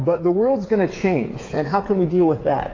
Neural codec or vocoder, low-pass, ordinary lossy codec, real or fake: codec, 16 kHz, 8 kbps, FreqCodec, smaller model; 7.2 kHz; AAC, 32 kbps; fake